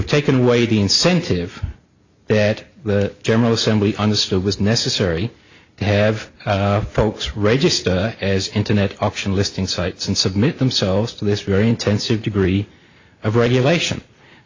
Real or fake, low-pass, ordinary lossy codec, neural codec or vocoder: real; 7.2 kHz; AAC, 48 kbps; none